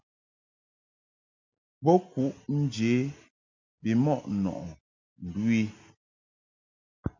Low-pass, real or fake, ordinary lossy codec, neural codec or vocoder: 7.2 kHz; real; MP3, 64 kbps; none